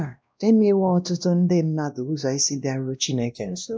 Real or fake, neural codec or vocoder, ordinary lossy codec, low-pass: fake; codec, 16 kHz, 1 kbps, X-Codec, WavLM features, trained on Multilingual LibriSpeech; none; none